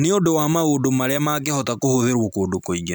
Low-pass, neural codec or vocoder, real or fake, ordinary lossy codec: none; none; real; none